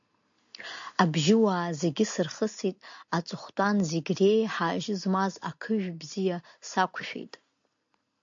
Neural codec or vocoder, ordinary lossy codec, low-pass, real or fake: none; AAC, 64 kbps; 7.2 kHz; real